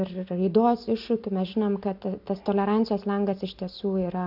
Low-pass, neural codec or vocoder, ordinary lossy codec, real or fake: 5.4 kHz; none; AAC, 48 kbps; real